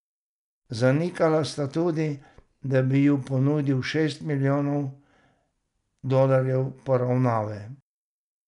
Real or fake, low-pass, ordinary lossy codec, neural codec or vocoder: real; 10.8 kHz; none; none